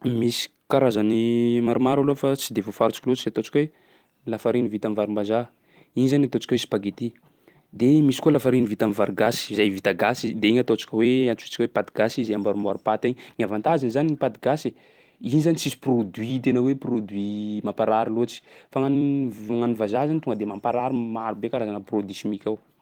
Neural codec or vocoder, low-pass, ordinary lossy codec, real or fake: vocoder, 44.1 kHz, 128 mel bands every 256 samples, BigVGAN v2; 19.8 kHz; Opus, 24 kbps; fake